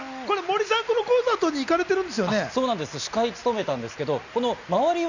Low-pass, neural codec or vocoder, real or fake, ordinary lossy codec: 7.2 kHz; none; real; none